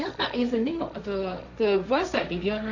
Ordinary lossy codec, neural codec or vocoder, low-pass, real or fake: none; codec, 16 kHz, 1.1 kbps, Voila-Tokenizer; 7.2 kHz; fake